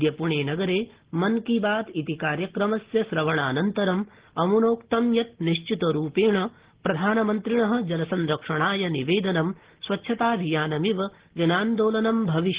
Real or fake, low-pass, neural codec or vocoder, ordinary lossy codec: real; 3.6 kHz; none; Opus, 16 kbps